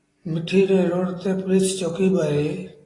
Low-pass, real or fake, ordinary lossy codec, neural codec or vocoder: 10.8 kHz; real; AAC, 32 kbps; none